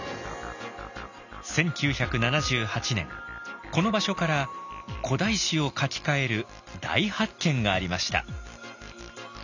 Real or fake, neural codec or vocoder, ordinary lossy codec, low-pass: real; none; none; 7.2 kHz